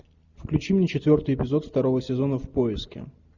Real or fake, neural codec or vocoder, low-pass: real; none; 7.2 kHz